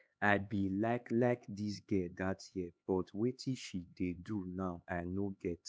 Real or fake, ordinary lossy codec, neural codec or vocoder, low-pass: fake; none; codec, 16 kHz, 4 kbps, X-Codec, HuBERT features, trained on LibriSpeech; none